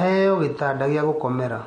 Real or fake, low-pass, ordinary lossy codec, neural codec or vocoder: real; 9.9 kHz; MP3, 48 kbps; none